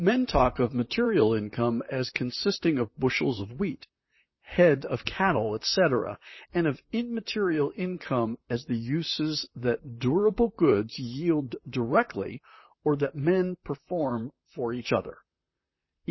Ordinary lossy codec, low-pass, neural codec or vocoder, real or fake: MP3, 24 kbps; 7.2 kHz; vocoder, 44.1 kHz, 128 mel bands, Pupu-Vocoder; fake